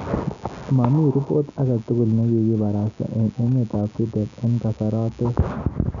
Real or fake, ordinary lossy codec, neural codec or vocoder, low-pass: real; none; none; 7.2 kHz